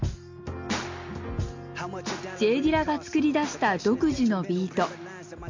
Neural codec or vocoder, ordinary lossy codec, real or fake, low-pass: none; MP3, 64 kbps; real; 7.2 kHz